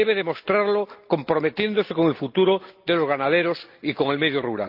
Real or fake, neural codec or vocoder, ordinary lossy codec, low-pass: real; none; Opus, 32 kbps; 5.4 kHz